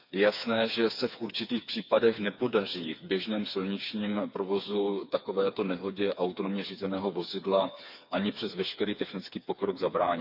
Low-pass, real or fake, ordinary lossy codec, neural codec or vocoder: 5.4 kHz; fake; none; codec, 16 kHz, 4 kbps, FreqCodec, smaller model